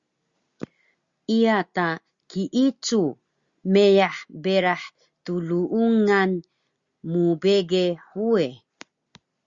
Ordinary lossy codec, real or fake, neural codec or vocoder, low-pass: Opus, 64 kbps; real; none; 7.2 kHz